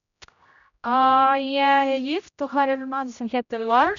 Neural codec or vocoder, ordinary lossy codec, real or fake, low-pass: codec, 16 kHz, 0.5 kbps, X-Codec, HuBERT features, trained on general audio; none; fake; 7.2 kHz